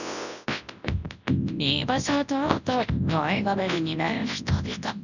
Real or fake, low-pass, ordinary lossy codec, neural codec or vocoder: fake; 7.2 kHz; none; codec, 24 kHz, 0.9 kbps, WavTokenizer, large speech release